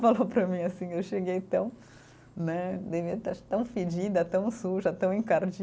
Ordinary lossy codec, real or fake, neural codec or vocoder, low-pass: none; real; none; none